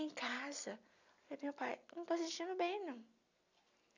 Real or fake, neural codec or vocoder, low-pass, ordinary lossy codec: real; none; 7.2 kHz; none